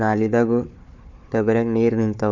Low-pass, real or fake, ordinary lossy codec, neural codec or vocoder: 7.2 kHz; fake; none; codec, 16 kHz, 4 kbps, FunCodec, trained on Chinese and English, 50 frames a second